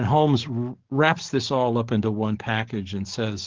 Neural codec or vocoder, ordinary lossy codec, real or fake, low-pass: codec, 44.1 kHz, 7.8 kbps, DAC; Opus, 16 kbps; fake; 7.2 kHz